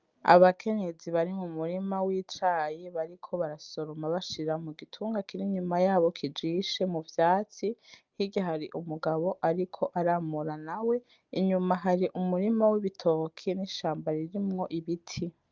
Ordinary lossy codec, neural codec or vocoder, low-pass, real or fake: Opus, 24 kbps; none; 7.2 kHz; real